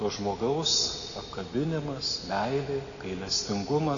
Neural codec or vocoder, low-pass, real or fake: none; 7.2 kHz; real